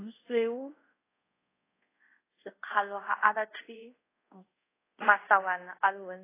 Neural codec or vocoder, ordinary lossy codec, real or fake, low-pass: codec, 24 kHz, 0.5 kbps, DualCodec; AAC, 16 kbps; fake; 3.6 kHz